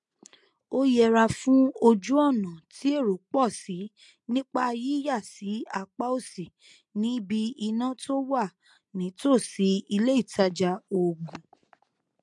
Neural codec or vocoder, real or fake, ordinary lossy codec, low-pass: none; real; MP3, 64 kbps; 10.8 kHz